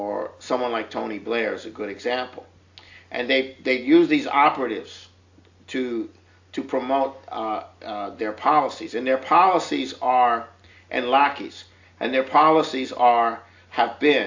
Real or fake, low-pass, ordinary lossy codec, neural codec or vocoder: real; 7.2 kHz; AAC, 48 kbps; none